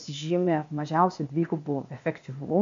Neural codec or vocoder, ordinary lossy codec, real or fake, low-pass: codec, 16 kHz, 0.8 kbps, ZipCodec; MP3, 64 kbps; fake; 7.2 kHz